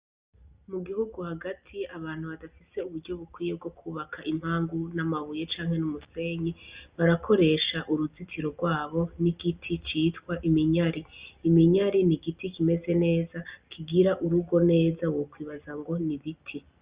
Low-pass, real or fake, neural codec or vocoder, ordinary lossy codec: 3.6 kHz; real; none; Opus, 64 kbps